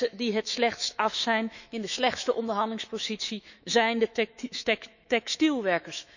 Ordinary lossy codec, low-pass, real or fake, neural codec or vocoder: none; 7.2 kHz; fake; autoencoder, 48 kHz, 128 numbers a frame, DAC-VAE, trained on Japanese speech